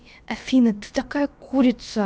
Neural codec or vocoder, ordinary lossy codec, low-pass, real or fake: codec, 16 kHz, about 1 kbps, DyCAST, with the encoder's durations; none; none; fake